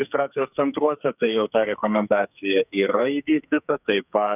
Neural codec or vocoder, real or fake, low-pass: codec, 44.1 kHz, 2.6 kbps, SNAC; fake; 3.6 kHz